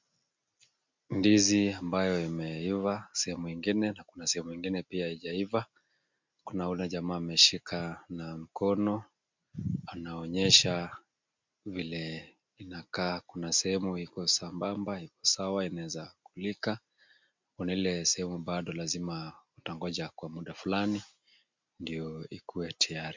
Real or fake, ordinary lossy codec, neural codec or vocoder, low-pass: real; MP3, 64 kbps; none; 7.2 kHz